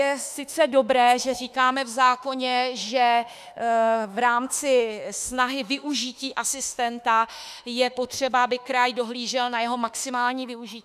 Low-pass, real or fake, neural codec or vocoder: 14.4 kHz; fake; autoencoder, 48 kHz, 32 numbers a frame, DAC-VAE, trained on Japanese speech